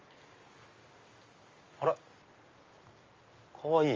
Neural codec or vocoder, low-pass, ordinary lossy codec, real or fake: none; 7.2 kHz; Opus, 32 kbps; real